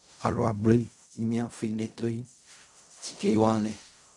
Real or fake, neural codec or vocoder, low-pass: fake; codec, 16 kHz in and 24 kHz out, 0.4 kbps, LongCat-Audio-Codec, fine tuned four codebook decoder; 10.8 kHz